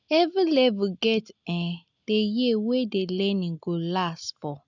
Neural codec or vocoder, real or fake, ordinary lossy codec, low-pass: none; real; none; 7.2 kHz